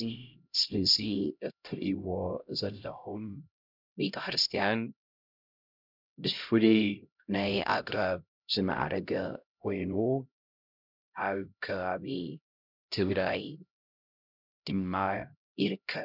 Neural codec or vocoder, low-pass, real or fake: codec, 16 kHz, 0.5 kbps, X-Codec, HuBERT features, trained on LibriSpeech; 5.4 kHz; fake